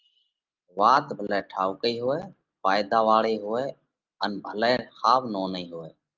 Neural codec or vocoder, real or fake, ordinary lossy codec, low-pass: none; real; Opus, 24 kbps; 7.2 kHz